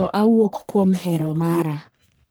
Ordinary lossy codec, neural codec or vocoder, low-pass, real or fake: none; codec, 44.1 kHz, 1.7 kbps, Pupu-Codec; none; fake